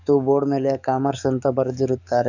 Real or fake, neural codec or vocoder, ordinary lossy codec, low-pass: fake; codec, 44.1 kHz, 7.8 kbps, DAC; none; 7.2 kHz